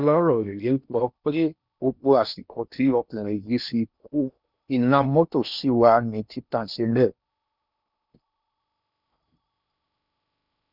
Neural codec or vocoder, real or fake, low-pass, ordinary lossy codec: codec, 16 kHz in and 24 kHz out, 0.8 kbps, FocalCodec, streaming, 65536 codes; fake; 5.4 kHz; none